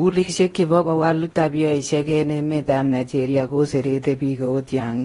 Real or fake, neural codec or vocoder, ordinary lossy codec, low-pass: fake; codec, 16 kHz in and 24 kHz out, 0.8 kbps, FocalCodec, streaming, 65536 codes; AAC, 32 kbps; 10.8 kHz